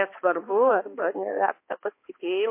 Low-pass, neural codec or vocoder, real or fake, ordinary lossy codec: 3.6 kHz; codec, 16 kHz, 2 kbps, X-Codec, HuBERT features, trained on balanced general audio; fake; MP3, 24 kbps